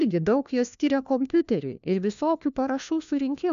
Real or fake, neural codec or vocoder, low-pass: fake; codec, 16 kHz, 1 kbps, FunCodec, trained on LibriTTS, 50 frames a second; 7.2 kHz